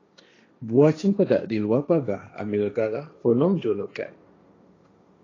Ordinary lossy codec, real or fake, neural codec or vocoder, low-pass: AAC, 32 kbps; fake; codec, 16 kHz, 1.1 kbps, Voila-Tokenizer; 7.2 kHz